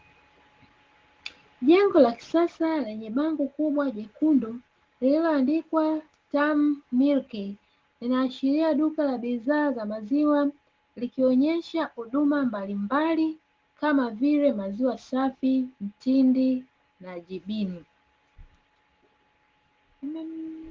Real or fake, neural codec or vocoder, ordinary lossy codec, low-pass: real; none; Opus, 16 kbps; 7.2 kHz